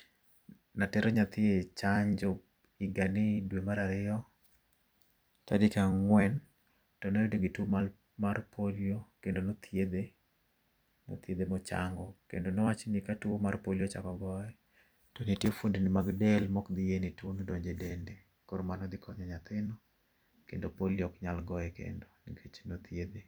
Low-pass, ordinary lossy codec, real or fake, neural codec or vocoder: none; none; fake; vocoder, 44.1 kHz, 128 mel bands every 256 samples, BigVGAN v2